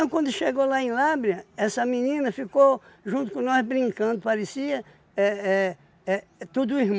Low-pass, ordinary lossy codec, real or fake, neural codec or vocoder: none; none; real; none